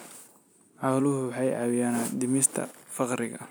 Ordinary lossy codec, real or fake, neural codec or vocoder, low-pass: none; real; none; none